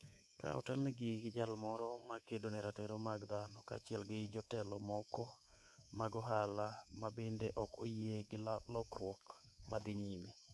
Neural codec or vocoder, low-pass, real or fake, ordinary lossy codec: codec, 24 kHz, 3.1 kbps, DualCodec; none; fake; none